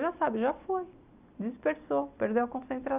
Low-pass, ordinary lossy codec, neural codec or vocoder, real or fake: 3.6 kHz; Opus, 64 kbps; none; real